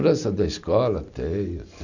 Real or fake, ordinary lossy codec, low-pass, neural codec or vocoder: real; none; 7.2 kHz; none